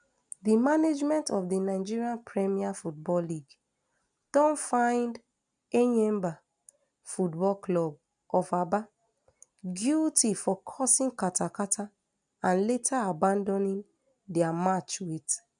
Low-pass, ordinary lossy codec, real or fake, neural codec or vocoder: 9.9 kHz; none; real; none